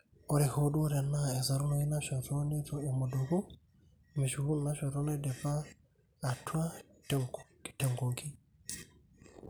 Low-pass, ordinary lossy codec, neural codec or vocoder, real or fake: none; none; none; real